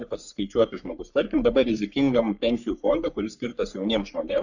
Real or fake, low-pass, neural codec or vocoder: fake; 7.2 kHz; codec, 44.1 kHz, 3.4 kbps, Pupu-Codec